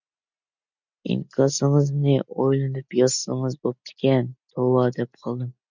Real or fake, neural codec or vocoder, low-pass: real; none; 7.2 kHz